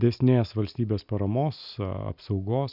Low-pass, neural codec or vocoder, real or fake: 5.4 kHz; none; real